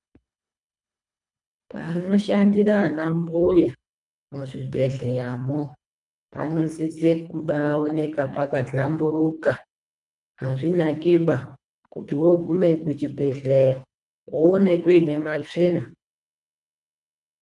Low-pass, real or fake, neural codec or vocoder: 10.8 kHz; fake; codec, 24 kHz, 1.5 kbps, HILCodec